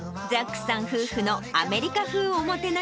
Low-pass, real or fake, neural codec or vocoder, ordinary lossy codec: none; real; none; none